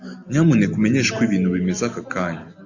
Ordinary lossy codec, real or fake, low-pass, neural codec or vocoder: AAC, 48 kbps; real; 7.2 kHz; none